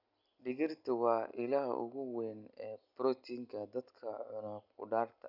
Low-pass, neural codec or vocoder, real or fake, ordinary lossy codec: 5.4 kHz; none; real; none